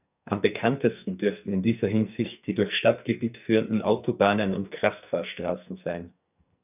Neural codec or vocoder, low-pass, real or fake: codec, 44.1 kHz, 2.6 kbps, SNAC; 3.6 kHz; fake